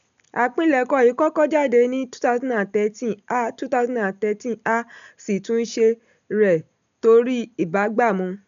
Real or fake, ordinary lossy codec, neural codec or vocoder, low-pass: real; none; none; 7.2 kHz